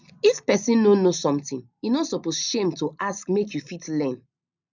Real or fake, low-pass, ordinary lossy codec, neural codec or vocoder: real; 7.2 kHz; none; none